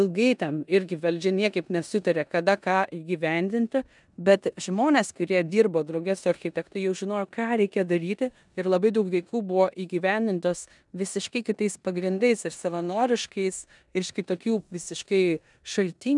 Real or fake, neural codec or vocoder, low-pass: fake; codec, 16 kHz in and 24 kHz out, 0.9 kbps, LongCat-Audio-Codec, four codebook decoder; 10.8 kHz